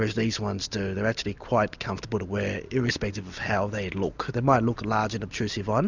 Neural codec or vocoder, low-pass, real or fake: none; 7.2 kHz; real